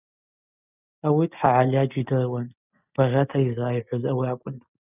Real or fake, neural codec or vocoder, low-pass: real; none; 3.6 kHz